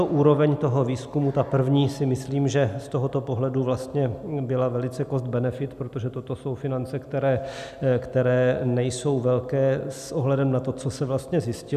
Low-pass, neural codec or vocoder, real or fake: 14.4 kHz; none; real